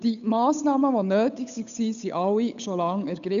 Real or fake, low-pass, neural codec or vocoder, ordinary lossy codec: fake; 7.2 kHz; codec, 16 kHz, 8 kbps, FunCodec, trained on Chinese and English, 25 frames a second; none